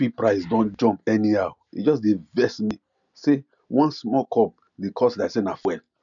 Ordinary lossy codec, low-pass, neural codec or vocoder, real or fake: none; 7.2 kHz; none; real